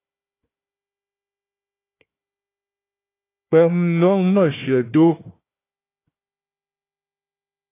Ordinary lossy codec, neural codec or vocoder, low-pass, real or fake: AAC, 24 kbps; codec, 16 kHz, 1 kbps, FunCodec, trained on Chinese and English, 50 frames a second; 3.6 kHz; fake